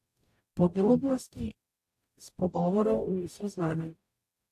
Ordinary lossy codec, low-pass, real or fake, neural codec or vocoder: AAC, 64 kbps; 14.4 kHz; fake; codec, 44.1 kHz, 0.9 kbps, DAC